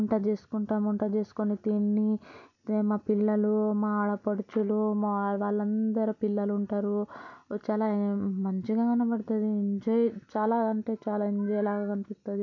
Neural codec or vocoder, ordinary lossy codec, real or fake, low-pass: none; none; real; 7.2 kHz